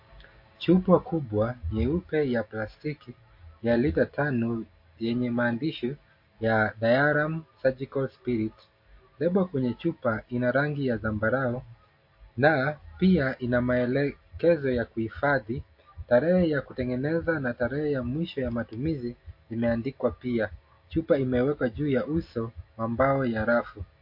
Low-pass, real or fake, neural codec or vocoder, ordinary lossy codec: 5.4 kHz; real; none; MP3, 32 kbps